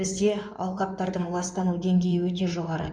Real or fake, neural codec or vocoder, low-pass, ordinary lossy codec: fake; codec, 16 kHz in and 24 kHz out, 2.2 kbps, FireRedTTS-2 codec; 9.9 kHz; none